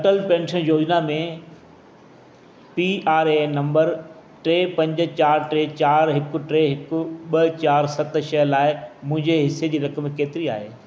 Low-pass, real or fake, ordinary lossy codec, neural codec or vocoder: none; real; none; none